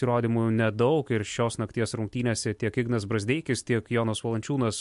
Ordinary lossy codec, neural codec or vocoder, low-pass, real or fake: MP3, 64 kbps; none; 10.8 kHz; real